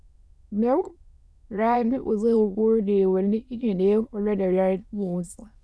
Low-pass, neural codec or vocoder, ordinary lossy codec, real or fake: none; autoencoder, 22.05 kHz, a latent of 192 numbers a frame, VITS, trained on many speakers; none; fake